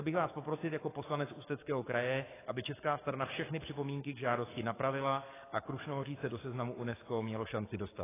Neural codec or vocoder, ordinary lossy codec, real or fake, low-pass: codec, 44.1 kHz, 7.8 kbps, DAC; AAC, 16 kbps; fake; 3.6 kHz